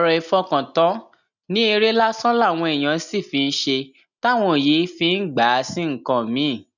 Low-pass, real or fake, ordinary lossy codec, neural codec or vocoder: 7.2 kHz; real; none; none